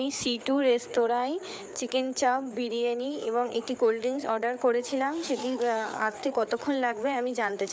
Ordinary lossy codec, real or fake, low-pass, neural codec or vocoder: none; fake; none; codec, 16 kHz, 4 kbps, FreqCodec, larger model